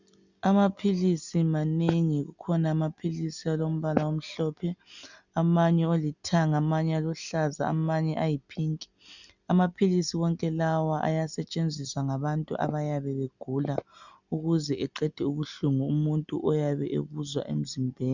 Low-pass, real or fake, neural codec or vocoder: 7.2 kHz; real; none